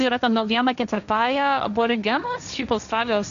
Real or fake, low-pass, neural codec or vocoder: fake; 7.2 kHz; codec, 16 kHz, 1.1 kbps, Voila-Tokenizer